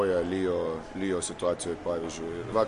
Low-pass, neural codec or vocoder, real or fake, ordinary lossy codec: 14.4 kHz; none; real; MP3, 48 kbps